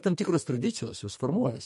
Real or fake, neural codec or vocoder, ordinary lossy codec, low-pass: fake; codec, 32 kHz, 1.9 kbps, SNAC; MP3, 48 kbps; 14.4 kHz